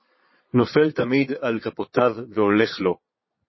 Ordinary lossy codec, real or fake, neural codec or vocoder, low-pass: MP3, 24 kbps; fake; vocoder, 22.05 kHz, 80 mel bands, Vocos; 7.2 kHz